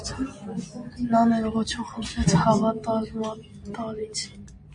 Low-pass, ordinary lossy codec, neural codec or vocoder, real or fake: 9.9 kHz; MP3, 48 kbps; none; real